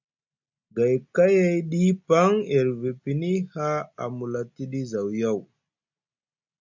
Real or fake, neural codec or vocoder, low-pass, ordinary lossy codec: real; none; 7.2 kHz; MP3, 64 kbps